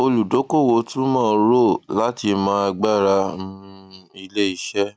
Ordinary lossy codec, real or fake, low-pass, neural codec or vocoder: none; real; none; none